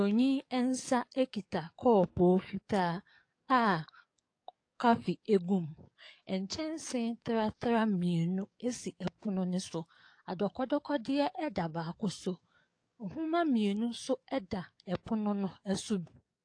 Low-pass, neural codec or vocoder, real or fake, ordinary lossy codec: 9.9 kHz; codec, 44.1 kHz, 7.8 kbps, DAC; fake; AAC, 48 kbps